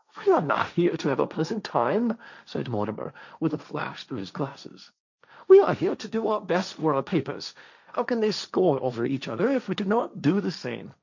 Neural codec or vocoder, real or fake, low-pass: codec, 16 kHz, 1.1 kbps, Voila-Tokenizer; fake; 7.2 kHz